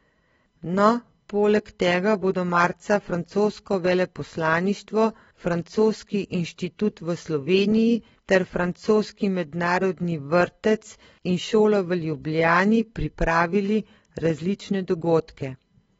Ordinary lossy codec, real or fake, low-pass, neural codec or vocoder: AAC, 24 kbps; real; 19.8 kHz; none